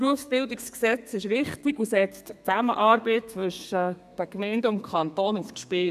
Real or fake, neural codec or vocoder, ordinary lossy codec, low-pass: fake; codec, 32 kHz, 1.9 kbps, SNAC; none; 14.4 kHz